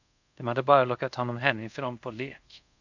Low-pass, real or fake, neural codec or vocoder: 7.2 kHz; fake; codec, 24 kHz, 0.5 kbps, DualCodec